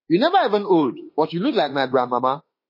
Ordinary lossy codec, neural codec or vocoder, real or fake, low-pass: MP3, 24 kbps; autoencoder, 48 kHz, 32 numbers a frame, DAC-VAE, trained on Japanese speech; fake; 5.4 kHz